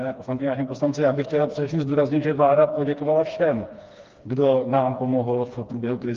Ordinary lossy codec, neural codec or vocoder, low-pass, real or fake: Opus, 24 kbps; codec, 16 kHz, 2 kbps, FreqCodec, smaller model; 7.2 kHz; fake